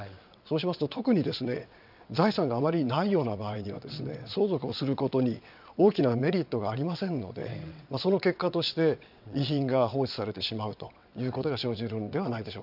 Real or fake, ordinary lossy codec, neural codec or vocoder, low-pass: fake; none; vocoder, 22.05 kHz, 80 mel bands, WaveNeXt; 5.4 kHz